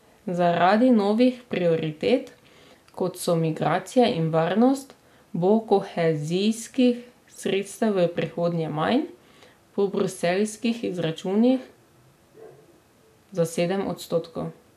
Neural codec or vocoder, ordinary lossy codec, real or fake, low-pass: none; AAC, 96 kbps; real; 14.4 kHz